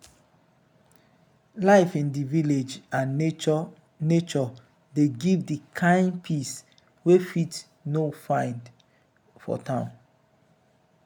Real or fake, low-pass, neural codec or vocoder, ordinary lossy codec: real; 19.8 kHz; none; none